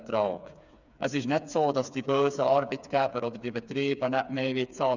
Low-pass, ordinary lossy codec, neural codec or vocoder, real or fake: 7.2 kHz; none; codec, 16 kHz, 4 kbps, FreqCodec, smaller model; fake